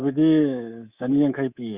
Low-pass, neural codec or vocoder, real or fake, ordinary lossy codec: 3.6 kHz; codec, 24 kHz, 3.1 kbps, DualCodec; fake; Opus, 64 kbps